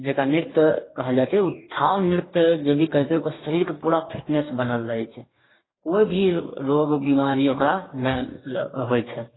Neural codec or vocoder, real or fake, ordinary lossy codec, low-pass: codec, 44.1 kHz, 2.6 kbps, DAC; fake; AAC, 16 kbps; 7.2 kHz